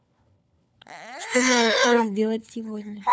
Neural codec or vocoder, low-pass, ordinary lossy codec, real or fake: codec, 16 kHz, 4 kbps, FunCodec, trained on LibriTTS, 50 frames a second; none; none; fake